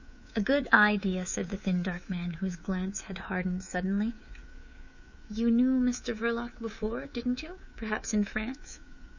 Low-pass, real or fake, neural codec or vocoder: 7.2 kHz; fake; codec, 24 kHz, 3.1 kbps, DualCodec